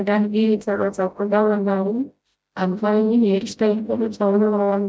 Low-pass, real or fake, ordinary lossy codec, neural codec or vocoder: none; fake; none; codec, 16 kHz, 0.5 kbps, FreqCodec, smaller model